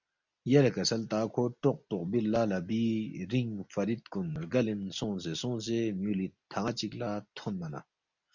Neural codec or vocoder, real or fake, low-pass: none; real; 7.2 kHz